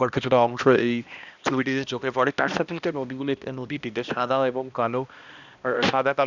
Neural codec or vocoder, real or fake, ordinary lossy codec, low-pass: codec, 16 kHz, 1 kbps, X-Codec, HuBERT features, trained on balanced general audio; fake; none; 7.2 kHz